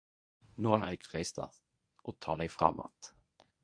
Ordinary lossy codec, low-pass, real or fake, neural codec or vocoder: AAC, 64 kbps; 9.9 kHz; fake; codec, 24 kHz, 0.9 kbps, WavTokenizer, medium speech release version 1